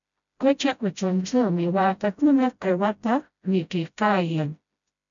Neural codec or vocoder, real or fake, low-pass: codec, 16 kHz, 0.5 kbps, FreqCodec, smaller model; fake; 7.2 kHz